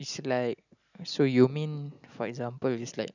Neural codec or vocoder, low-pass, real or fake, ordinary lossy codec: none; 7.2 kHz; real; none